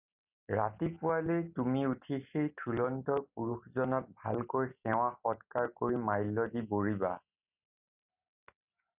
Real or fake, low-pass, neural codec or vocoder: real; 3.6 kHz; none